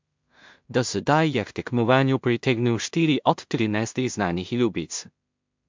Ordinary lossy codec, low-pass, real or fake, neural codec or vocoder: MP3, 64 kbps; 7.2 kHz; fake; codec, 16 kHz in and 24 kHz out, 0.4 kbps, LongCat-Audio-Codec, two codebook decoder